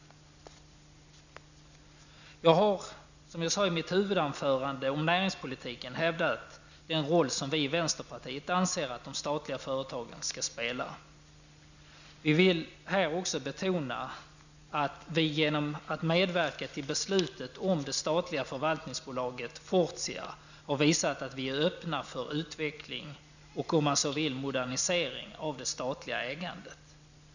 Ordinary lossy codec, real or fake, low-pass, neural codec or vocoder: none; real; 7.2 kHz; none